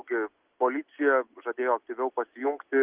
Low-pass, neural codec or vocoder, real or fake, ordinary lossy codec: 3.6 kHz; none; real; Opus, 32 kbps